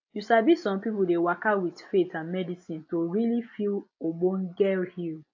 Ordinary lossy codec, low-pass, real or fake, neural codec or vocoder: AAC, 48 kbps; 7.2 kHz; real; none